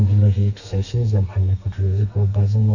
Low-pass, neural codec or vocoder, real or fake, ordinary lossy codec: 7.2 kHz; codec, 32 kHz, 1.9 kbps, SNAC; fake; none